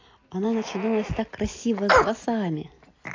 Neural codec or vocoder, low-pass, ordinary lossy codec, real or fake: none; 7.2 kHz; AAC, 32 kbps; real